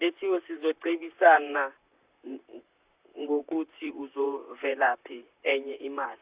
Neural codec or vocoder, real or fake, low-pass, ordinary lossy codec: vocoder, 44.1 kHz, 128 mel bands, Pupu-Vocoder; fake; 3.6 kHz; Opus, 64 kbps